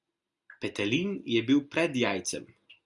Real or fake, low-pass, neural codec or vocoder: real; 10.8 kHz; none